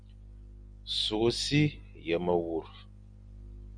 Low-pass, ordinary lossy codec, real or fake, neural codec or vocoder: 9.9 kHz; Opus, 64 kbps; real; none